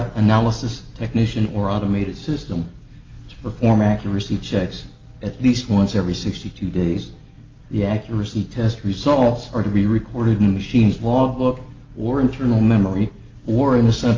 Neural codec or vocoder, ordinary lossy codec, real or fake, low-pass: none; Opus, 24 kbps; real; 7.2 kHz